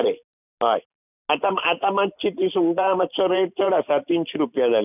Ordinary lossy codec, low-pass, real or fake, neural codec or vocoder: none; 3.6 kHz; fake; vocoder, 44.1 kHz, 128 mel bands every 512 samples, BigVGAN v2